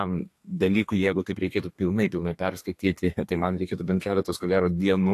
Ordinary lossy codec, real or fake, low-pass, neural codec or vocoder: AAC, 64 kbps; fake; 14.4 kHz; codec, 32 kHz, 1.9 kbps, SNAC